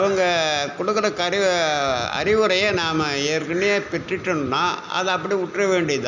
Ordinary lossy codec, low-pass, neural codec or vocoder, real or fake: none; 7.2 kHz; none; real